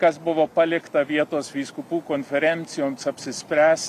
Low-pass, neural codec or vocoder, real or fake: 14.4 kHz; none; real